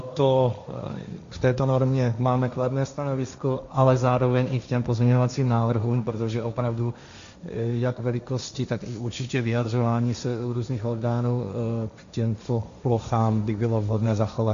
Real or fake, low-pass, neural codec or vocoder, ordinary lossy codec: fake; 7.2 kHz; codec, 16 kHz, 1.1 kbps, Voila-Tokenizer; MP3, 64 kbps